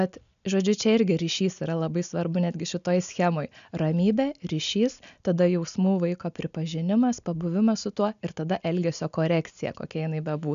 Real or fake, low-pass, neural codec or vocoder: real; 7.2 kHz; none